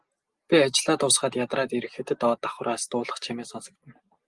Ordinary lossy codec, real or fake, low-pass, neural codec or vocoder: Opus, 24 kbps; real; 10.8 kHz; none